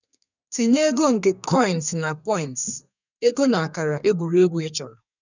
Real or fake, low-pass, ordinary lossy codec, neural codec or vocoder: fake; 7.2 kHz; none; codec, 32 kHz, 1.9 kbps, SNAC